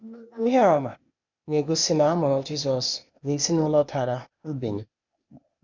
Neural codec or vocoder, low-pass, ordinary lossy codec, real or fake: codec, 16 kHz, 0.8 kbps, ZipCodec; 7.2 kHz; none; fake